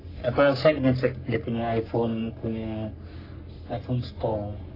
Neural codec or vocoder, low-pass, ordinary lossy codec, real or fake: codec, 44.1 kHz, 3.4 kbps, Pupu-Codec; 5.4 kHz; AAC, 24 kbps; fake